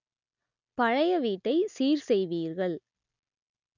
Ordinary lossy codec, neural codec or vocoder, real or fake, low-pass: none; none; real; 7.2 kHz